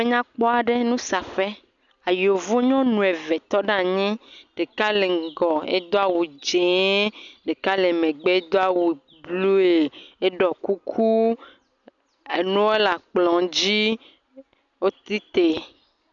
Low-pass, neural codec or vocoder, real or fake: 7.2 kHz; none; real